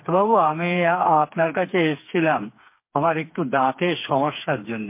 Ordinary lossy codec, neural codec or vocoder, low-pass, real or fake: MP3, 24 kbps; codec, 16 kHz, 4 kbps, FreqCodec, smaller model; 3.6 kHz; fake